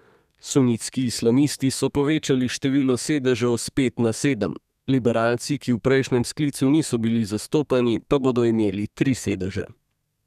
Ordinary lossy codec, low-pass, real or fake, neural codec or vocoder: none; 14.4 kHz; fake; codec, 32 kHz, 1.9 kbps, SNAC